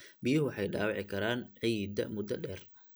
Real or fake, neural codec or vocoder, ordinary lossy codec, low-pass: real; none; none; none